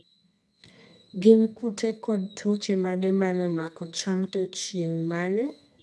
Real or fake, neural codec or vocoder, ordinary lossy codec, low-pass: fake; codec, 24 kHz, 0.9 kbps, WavTokenizer, medium music audio release; none; none